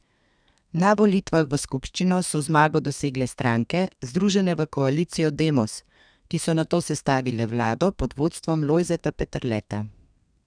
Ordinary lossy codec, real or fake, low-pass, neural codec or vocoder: none; fake; 9.9 kHz; codec, 32 kHz, 1.9 kbps, SNAC